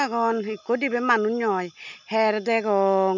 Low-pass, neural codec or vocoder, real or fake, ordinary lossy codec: 7.2 kHz; none; real; none